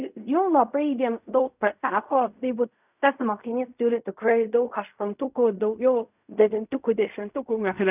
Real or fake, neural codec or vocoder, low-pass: fake; codec, 16 kHz in and 24 kHz out, 0.4 kbps, LongCat-Audio-Codec, fine tuned four codebook decoder; 3.6 kHz